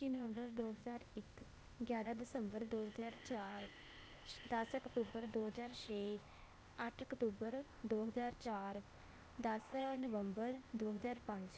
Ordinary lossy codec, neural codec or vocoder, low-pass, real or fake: none; codec, 16 kHz, 0.8 kbps, ZipCodec; none; fake